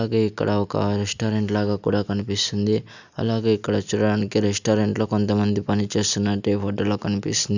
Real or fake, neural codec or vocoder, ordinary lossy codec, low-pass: real; none; none; 7.2 kHz